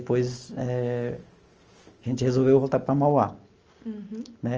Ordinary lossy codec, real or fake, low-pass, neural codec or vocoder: Opus, 24 kbps; real; 7.2 kHz; none